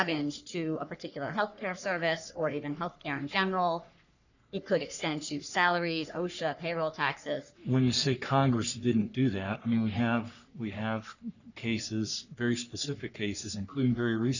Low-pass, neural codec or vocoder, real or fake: 7.2 kHz; codec, 44.1 kHz, 3.4 kbps, Pupu-Codec; fake